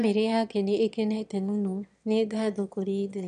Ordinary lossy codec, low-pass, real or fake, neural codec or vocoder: none; 9.9 kHz; fake; autoencoder, 22.05 kHz, a latent of 192 numbers a frame, VITS, trained on one speaker